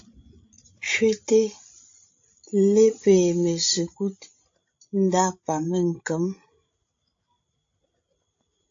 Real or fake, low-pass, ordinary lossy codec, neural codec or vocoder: fake; 7.2 kHz; AAC, 48 kbps; codec, 16 kHz, 16 kbps, FreqCodec, larger model